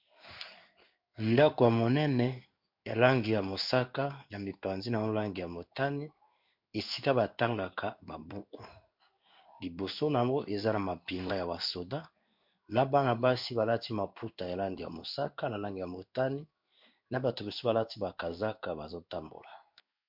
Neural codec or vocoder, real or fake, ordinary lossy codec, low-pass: codec, 16 kHz in and 24 kHz out, 1 kbps, XY-Tokenizer; fake; MP3, 48 kbps; 5.4 kHz